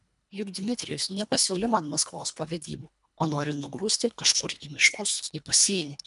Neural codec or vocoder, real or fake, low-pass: codec, 24 kHz, 1.5 kbps, HILCodec; fake; 10.8 kHz